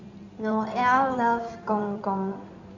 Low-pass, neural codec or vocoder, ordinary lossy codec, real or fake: 7.2 kHz; codec, 16 kHz in and 24 kHz out, 2.2 kbps, FireRedTTS-2 codec; Opus, 64 kbps; fake